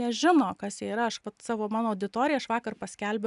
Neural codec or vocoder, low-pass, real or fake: none; 10.8 kHz; real